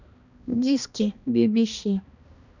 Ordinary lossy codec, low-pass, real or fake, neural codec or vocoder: none; 7.2 kHz; fake; codec, 16 kHz, 1 kbps, X-Codec, HuBERT features, trained on balanced general audio